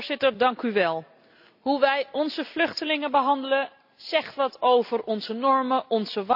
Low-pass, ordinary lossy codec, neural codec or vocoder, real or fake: 5.4 kHz; AAC, 48 kbps; none; real